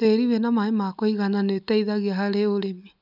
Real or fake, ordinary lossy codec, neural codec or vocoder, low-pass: real; none; none; 5.4 kHz